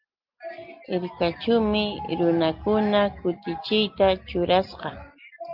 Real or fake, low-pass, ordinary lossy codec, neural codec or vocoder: real; 5.4 kHz; Opus, 24 kbps; none